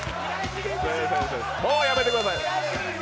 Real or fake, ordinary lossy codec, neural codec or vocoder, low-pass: real; none; none; none